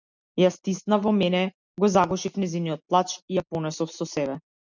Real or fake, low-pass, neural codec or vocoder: real; 7.2 kHz; none